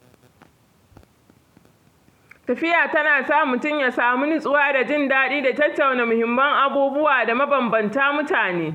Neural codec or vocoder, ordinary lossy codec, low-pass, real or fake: none; none; 19.8 kHz; real